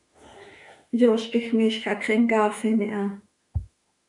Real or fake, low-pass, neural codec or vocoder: fake; 10.8 kHz; autoencoder, 48 kHz, 32 numbers a frame, DAC-VAE, trained on Japanese speech